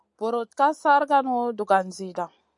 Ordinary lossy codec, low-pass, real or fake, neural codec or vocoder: MP3, 96 kbps; 10.8 kHz; real; none